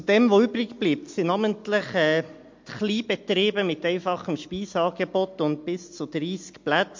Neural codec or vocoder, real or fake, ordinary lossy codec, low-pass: none; real; MP3, 48 kbps; 7.2 kHz